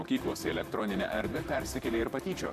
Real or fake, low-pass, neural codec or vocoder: fake; 14.4 kHz; vocoder, 44.1 kHz, 128 mel bands, Pupu-Vocoder